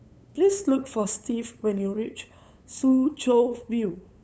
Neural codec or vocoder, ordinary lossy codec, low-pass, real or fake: codec, 16 kHz, 8 kbps, FunCodec, trained on LibriTTS, 25 frames a second; none; none; fake